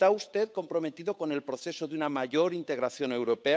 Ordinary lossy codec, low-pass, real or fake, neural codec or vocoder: none; none; fake; codec, 16 kHz, 8 kbps, FunCodec, trained on Chinese and English, 25 frames a second